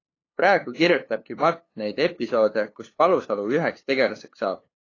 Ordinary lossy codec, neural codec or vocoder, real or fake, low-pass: AAC, 32 kbps; codec, 16 kHz, 2 kbps, FunCodec, trained on LibriTTS, 25 frames a second; fake; 7.2 kHz